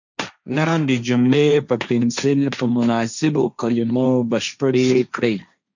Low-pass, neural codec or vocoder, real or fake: 7.2 kHz; codec, 16 kHz, 1.1 kbps, Voila-Tokenizer; fake